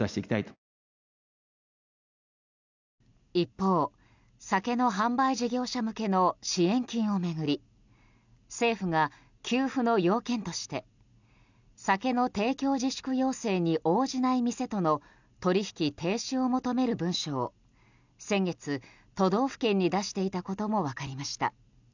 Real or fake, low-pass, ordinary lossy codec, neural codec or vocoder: real; 7.2 kHz; none; none